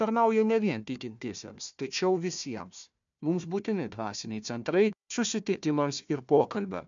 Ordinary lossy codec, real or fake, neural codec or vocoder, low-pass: MP3, 64 kbps; fake; codec, 16 kHz, 1 kbps, FunCodec, trained on Chinese and English, 50 frames a second; 7.2 kHz